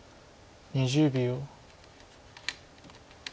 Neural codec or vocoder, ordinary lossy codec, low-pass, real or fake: none; none; none; real